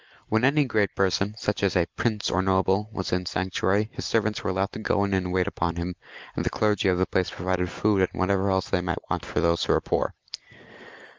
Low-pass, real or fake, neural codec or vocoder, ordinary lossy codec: 7.2 kHz; real; none; Opus, 24 kbps